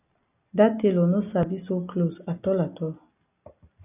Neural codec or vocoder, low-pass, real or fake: none; 3.6 kHz; real